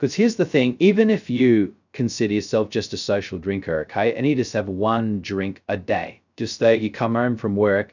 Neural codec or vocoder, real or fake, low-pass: codec, 16 kHz, 0.2 kbps, FocalCodec; fake; 7.2 kHz